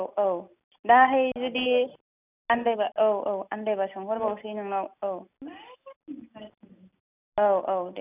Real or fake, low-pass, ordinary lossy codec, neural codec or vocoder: real; 3.6 kHz; none; none